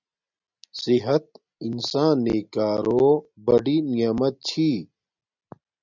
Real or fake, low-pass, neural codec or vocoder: real; 7.2 kHz; none